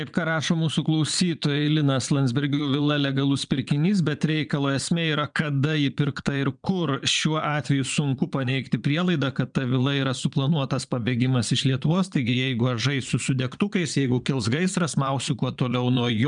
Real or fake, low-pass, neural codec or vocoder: fake; 9.9 kHz; vocoder, 22.05 kHz, 80 mel bands, Vocos